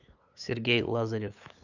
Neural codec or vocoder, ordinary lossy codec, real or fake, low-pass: codec, 16 kHz, 8 kbps, FunCodec, trained on LibriTTS, 25 frames a second; none; fake; 7.2 kHz